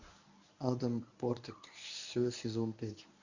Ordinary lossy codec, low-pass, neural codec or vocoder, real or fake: Opus, 64 kbps; 7.2 kHz; codec, 24 kHz, 0.9 kbps, WavTokenizer, medium speech release version 1; fake